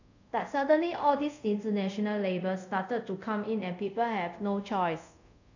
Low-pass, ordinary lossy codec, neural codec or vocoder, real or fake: 7.2 kHz; none; codec, 24 kHz, 0.5 kbps, DualCodec; fake